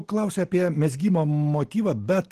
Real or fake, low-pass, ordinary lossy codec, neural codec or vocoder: real; 14.4 kHz; Opus, 16 kbps; none